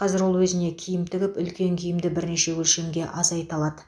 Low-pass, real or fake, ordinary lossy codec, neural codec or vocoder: none; real; none; none